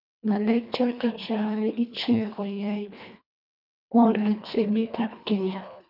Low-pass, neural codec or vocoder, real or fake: 5.4 kHz; codec, 24 kHz, 1.5 kbps, HILCodec; fake